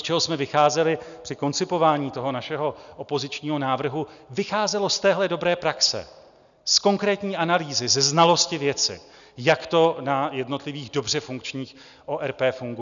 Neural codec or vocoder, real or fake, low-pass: none; real; 7.2 kHz